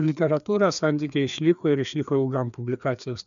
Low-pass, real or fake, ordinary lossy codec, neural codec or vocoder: 7.2 kHz; fake; MP3, 96 kbps; codec, 16 kHz, 2 kbps, FreqCodec, larger model